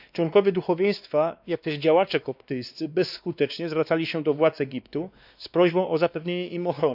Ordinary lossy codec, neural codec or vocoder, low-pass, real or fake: none; codec, 16 kHz, 2 kbps, X-Codec, WavLM features, trained on Multilingual LibriSpeech; 5.4 kHz; fake